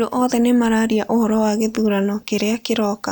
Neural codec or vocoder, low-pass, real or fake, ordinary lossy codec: none; none; real; none